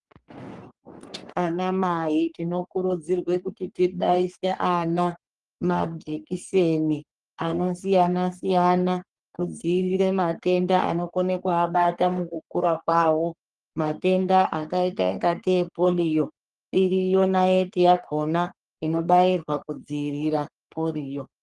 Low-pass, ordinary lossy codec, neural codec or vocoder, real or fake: 10.8 kHz; Opus, 24 kbps; codec, 44.1 kHz, 3.4 kbps, Pupu-Codec; fake